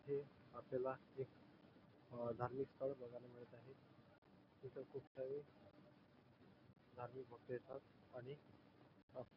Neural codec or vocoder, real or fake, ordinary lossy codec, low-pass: none; real; none; 5.4 kHz